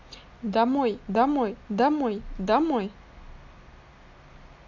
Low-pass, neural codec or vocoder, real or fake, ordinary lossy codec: 7.2 kHz; none; real; MP3, 64 kbps